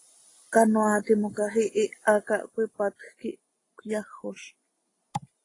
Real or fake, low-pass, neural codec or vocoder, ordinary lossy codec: real; 10.8 kHz; none; AAC, 32 kbps